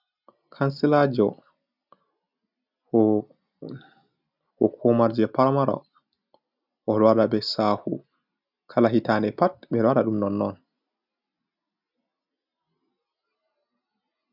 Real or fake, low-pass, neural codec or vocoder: real; 5.4 kHz; none